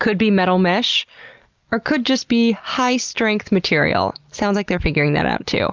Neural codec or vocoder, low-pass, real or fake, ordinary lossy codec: none; 7.2 kHz; real; Opus, 24 kbps